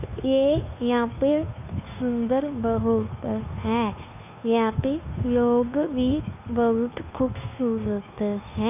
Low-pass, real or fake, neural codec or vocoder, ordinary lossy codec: 3.6 kHz; fake; codec, 16 kHz, 2 kbps, FunCodec, trained on Chinese and English, 25 frames a second; AAC, 32 kbps